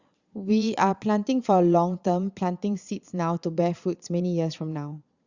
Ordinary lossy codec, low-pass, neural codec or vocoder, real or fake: Opus, 64 kbps; 7.2 kHz; vocoder, 22.05 kHz, 80 mel bands, Vocos; fake